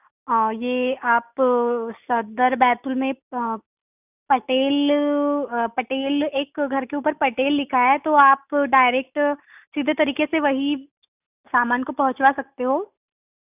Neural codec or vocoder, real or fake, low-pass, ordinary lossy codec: none; real; 3.6 kHz; none